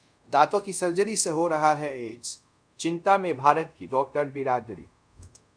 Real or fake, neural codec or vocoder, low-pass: fake; codec, 24 kHz, 0.5 kbps, DualCodec; 9.9 kHz